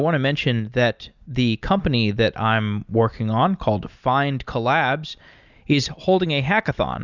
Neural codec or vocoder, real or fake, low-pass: none; real; 7.2 kHz